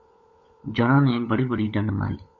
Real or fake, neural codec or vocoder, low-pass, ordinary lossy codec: fake; codec, 16 kHz, 16 kbps, FunCodec, trained on LibriTTS, 50 frames a second; 7.2 kHz; AAC, 48 kbps